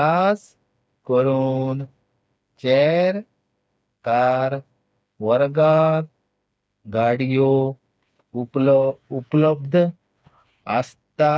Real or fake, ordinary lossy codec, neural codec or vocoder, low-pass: fake; none; codec, 16 kHz, 4 kbps, FreqCodec, smaller model; none